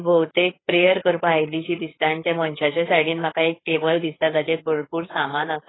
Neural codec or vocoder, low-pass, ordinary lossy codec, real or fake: codec, 16 kHz, 4 kbps, FreqCodec, larger model; 7.2 kHz; AAC, 16 kbps; fake